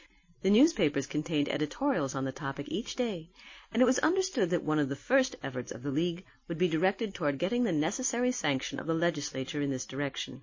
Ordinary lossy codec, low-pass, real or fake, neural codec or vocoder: MP3, 32 kbps; 7.2 kHz; real; none